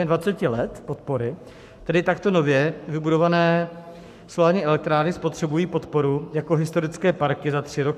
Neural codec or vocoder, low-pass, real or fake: codec, 44.1 kHz, 7.8 kbps, Pupu-Codec; 14.4 kHz; fake